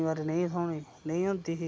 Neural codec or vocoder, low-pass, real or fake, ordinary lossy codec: none; none; real; none